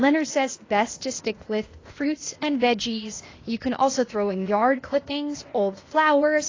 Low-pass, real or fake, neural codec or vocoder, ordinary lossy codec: 7.2 kHz; fake; codec, 16 kHz, 0.8 kbps, ZipCodec; AAC, 32 kbps